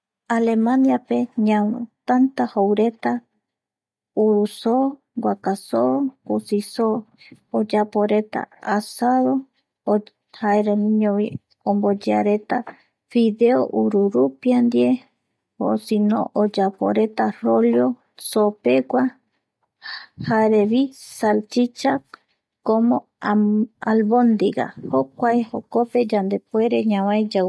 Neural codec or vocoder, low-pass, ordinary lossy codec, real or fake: none; none; none; real